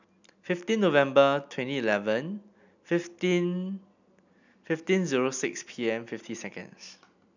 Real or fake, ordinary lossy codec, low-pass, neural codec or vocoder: real; none; 7.2 kHz; none